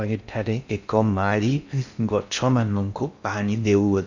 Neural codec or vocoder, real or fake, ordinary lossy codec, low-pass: codec, 16 kHz in and 24 kHz out, 0.6 kbps, FocalCodec, streaming, 2048 codes; fake; none; 7.2 kHz